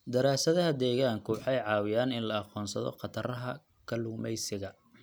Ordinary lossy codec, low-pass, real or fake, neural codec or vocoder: none; none; real; none